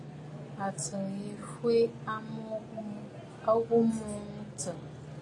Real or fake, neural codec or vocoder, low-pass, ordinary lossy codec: real; none; 10.8 kHz; AAC, 32 kbps